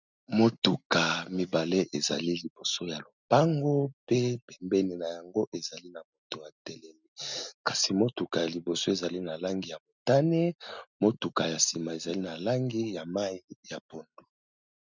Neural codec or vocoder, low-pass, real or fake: none; 7.2 kHz; real